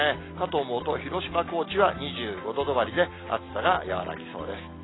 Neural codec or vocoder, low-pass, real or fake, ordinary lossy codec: none; 7.2 kHz; real; AAC, 16 kbps